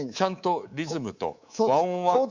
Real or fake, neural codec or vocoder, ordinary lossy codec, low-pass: fake; codec, 24 kHz, 3.1 kbps, DualCodec; Opus, 64 kbps; 7.2 kHz